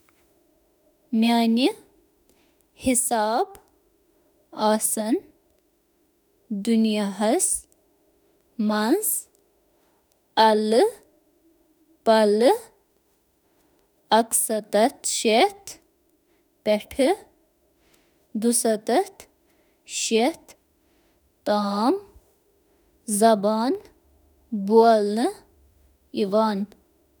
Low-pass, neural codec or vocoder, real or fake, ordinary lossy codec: none; autoencoder, 48 kHz, 32 numbers a frame, DAC-VAE, trained on Japanese speech; fake; none